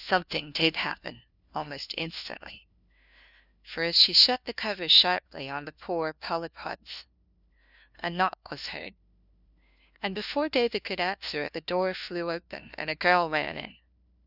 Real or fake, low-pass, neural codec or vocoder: fake; 5.4 kHz; codec, 16 kHz, 1 kbps, FunCodec, trained on LibriTTS, 50 frames a second